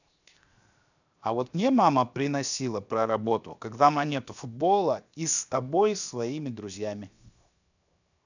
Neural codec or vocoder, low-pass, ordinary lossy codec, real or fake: codec, 16 kHz, 0.7 kbps, FocalCodec; 7.2 kHz; none; fake